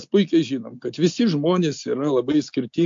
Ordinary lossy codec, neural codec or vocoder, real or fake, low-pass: MP3, 48 kbps; none; real; 7.2 kHz